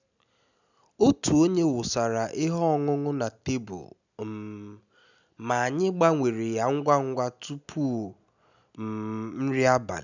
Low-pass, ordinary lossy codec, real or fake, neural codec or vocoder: 7.2 kHz; none; real; none